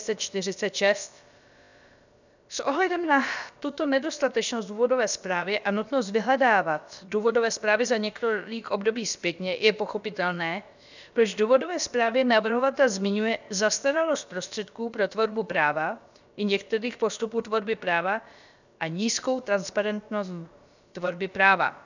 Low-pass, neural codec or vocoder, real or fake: 7.2 kHz; codec, 16 kHz, about 1 kbps, DyCAST, with the encoder's durations; fake